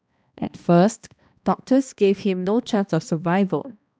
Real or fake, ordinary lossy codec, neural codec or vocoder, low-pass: fake; none; codec, 16 kHz, 1 kbps, X-Codec, HuBERT features, trained on balanced general audio; none